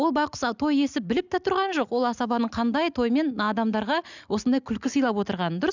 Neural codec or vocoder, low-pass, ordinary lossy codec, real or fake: none; 7.2 kHz; none; real